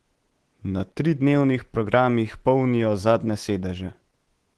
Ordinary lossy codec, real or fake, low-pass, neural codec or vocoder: Opus, 16 kbps; fake; 10.8 kHz; codec, 24 kHz, 3.1 kbps, DualCodec